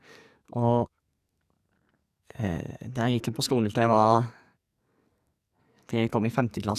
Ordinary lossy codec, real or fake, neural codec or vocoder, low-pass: none; fake; codec, 44.1 kHz, 2.6 kbps, SNAC; 14.4 kHz